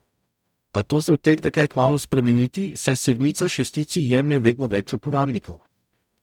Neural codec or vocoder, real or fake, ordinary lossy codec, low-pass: codec, 44.1 kHz, 0.9 kbps, DAC; fake; none; 19.8 kHz